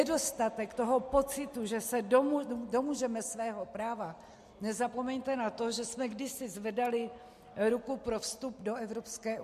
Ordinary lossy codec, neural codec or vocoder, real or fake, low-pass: MP3, 64 kbps; none; real; 14.4 kHz